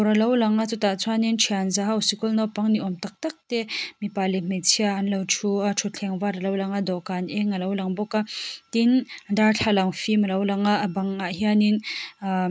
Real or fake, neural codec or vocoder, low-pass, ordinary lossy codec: real; none; none; none